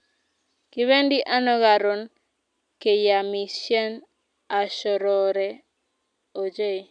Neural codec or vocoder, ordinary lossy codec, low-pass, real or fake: none; none; 9.9 kHz; real